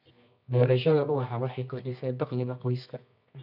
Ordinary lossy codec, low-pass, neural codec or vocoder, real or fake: none; 5.4 kHz; codec, 24 kHz, 0.9 kbps, WavTokenizer, medium music audio release; fake